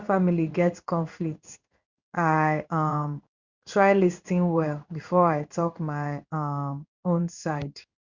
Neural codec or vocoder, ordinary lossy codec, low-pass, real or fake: codec, 16 kHz in and 24 kHz out, 1 kbps, XY-Tokenizer; Opus, 64 kbps; 7.2 kHz; fake